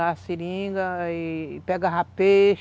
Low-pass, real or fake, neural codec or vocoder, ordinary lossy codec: none; real; none; none